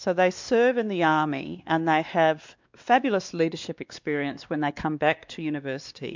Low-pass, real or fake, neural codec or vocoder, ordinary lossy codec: 7.2 kHz; fake; codec, 16 kHz, 2 kbps, X-Codec, WavLM features, trained on Multilingual LibriSpeech; MP3, 64 kbps